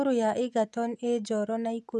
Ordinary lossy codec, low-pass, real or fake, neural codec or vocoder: none; none; real; none